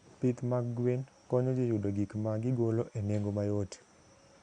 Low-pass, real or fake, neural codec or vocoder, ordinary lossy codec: 9.9 kHz; real; none; Opus, 64 kbps